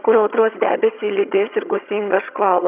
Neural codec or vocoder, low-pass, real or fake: vocoder, 22.05 kHz, 80 mel bands, HiFi-GAN; 3.6 kHz; fake